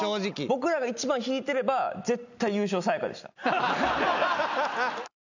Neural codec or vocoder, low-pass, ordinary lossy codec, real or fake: none; 7.2 kHz; none; real